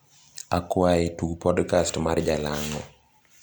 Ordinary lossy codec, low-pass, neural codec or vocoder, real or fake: none; none; none; real